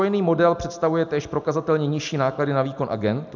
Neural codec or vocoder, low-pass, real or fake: none; 7.2 kHz; real